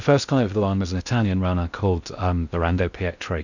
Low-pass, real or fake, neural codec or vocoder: 7.2 kHz; fake; codec, 16 kHz in and 24 kHz out, 0.6 kbps, FocalCodec, streaming, 2048 codes